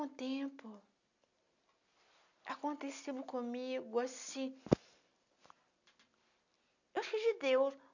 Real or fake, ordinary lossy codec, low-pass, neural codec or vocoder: real; none; 7.2 kHz; none